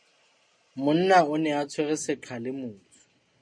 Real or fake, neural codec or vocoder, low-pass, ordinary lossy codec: real; none; 9.9 kHz; MP3, 48 kbps